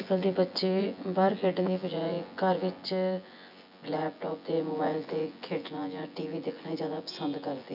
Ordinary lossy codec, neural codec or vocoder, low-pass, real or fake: none; vocoder, 24 kHz, 100 mel bands, Vocos; 5.4 kHz; fake